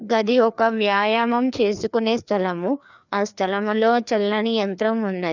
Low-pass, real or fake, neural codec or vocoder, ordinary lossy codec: 7.2 kHz; fake; codec, 16 kHz, 2 kbps, FreqCodec, larger model; none